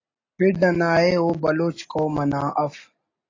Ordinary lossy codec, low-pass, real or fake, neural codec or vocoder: AAC, 32 kbps; 7.2 kHz; real; none